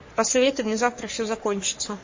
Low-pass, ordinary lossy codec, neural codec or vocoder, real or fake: 7.2 kHz; MP3, 32 kbps; codec, 44.1 kHz, 3.4 kbps, Pupu-Codec; fake